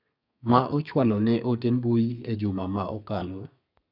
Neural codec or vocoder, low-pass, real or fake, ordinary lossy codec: codec, 32 kHz, 1.9 kbps, SNAC; 5.4 kHz; fake; none